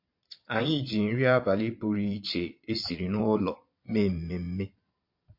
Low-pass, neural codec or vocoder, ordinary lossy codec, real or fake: 5.4 kHz; vocoder, 22.05 kHz, 80 mel bands, Vocos; MP3, 32 kbps; fake